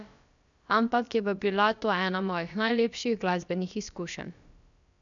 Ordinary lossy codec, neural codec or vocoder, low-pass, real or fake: none; codec, 16 kHz, about 1 kbps, DyCAST, with the encoder's durations; 7.2 kHz; fake